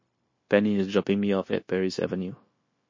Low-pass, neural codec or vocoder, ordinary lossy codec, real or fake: 7.2 kHz; codec, 16 kHz, 0.9 kbps, LongCat-Audio-Codec; MP3, 32 kbps; fake